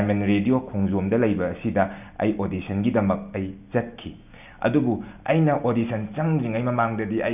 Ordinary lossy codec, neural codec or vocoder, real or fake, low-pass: none; none; real; 3.6 kHz